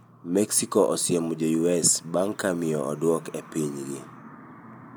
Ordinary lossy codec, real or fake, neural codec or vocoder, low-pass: none; real; none; none